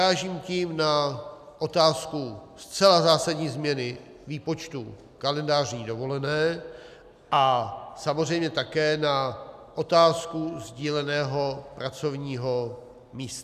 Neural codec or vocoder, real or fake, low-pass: none; real; 14.4 kHz